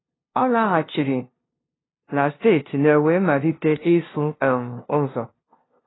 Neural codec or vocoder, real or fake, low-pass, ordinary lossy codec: codec, 16 kHz, 0.5 kbps, FunCodec, trained on LibriTTS, 25 frames a second; fake; 7.2 kHz; AAC, 16 kbps